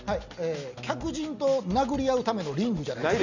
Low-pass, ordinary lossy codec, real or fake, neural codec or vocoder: 7.2 kHz; none; real; none